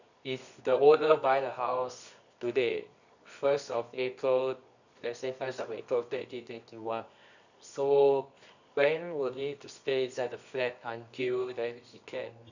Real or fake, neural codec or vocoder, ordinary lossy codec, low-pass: fake; codec, 24 kHz, 0.9 kbps, WavTokenizer, medium music audio release; none; 7.2 kHz